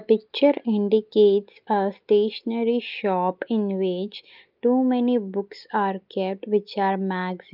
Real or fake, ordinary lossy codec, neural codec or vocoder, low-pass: real; Opus, 24 kbps; none; 5.4 kHz